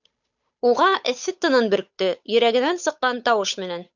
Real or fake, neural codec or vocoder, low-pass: fake; codec, 16 kHz, 8 kbps, FunCodec, trained on Chinese and English, 25 frames a second; 7.2 kHz